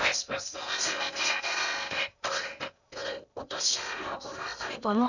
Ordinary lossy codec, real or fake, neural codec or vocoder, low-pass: none; fake; codec, 16 kHz in and 24 kHz out, 0.6 kbps, FocalCodec, streaming, 2048 codes; 7.2 kHz